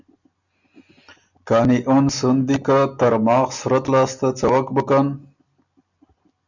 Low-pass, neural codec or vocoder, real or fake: 7.2 kHz; none; real